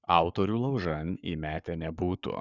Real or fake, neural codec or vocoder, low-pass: fake; codec, 44.1 kHz, 7.8 kbps, Pupu-Codec; 7.2 kHz